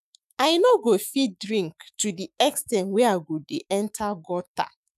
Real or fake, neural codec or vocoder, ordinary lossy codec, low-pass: fake; autoencoder, 48 kHz, 128 numbers a frame, DAC-VAE, trained on Japanese speech; none; 14.4 kHz